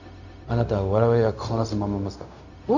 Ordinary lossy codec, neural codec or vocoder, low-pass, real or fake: none; codec, 16 kHz, 0.4 kbps, LongCat-Audio-Codec; 7.2 kHz; fake